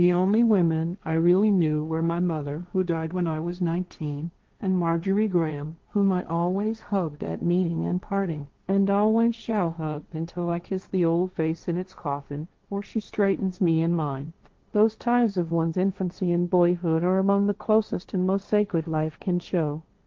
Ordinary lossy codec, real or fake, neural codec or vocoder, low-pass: Opus, 24 kbps; fake; codec, 16 kHz, 1.1 kbps, Voila-Tokenizer; 7.2 kHz